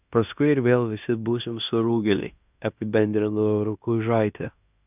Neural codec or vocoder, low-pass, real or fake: codec, 16 kHz in and 24 kHz out, 0.9 kbps, LongCat-Audio-Codec, fine tuned four codebook decoder; 3.6 kHz; fake